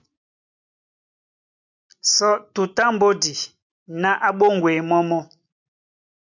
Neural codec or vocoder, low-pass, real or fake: none; 7.2 kHz; real